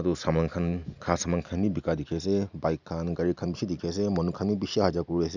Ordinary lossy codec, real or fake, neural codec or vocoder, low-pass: none; real; none; 7.2 kHz